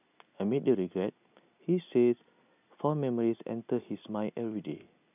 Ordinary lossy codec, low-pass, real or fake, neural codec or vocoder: none; 3.6 kHz; real; none